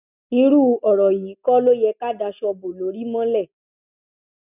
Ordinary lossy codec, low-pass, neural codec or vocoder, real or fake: none; 3.6 kHz; none; real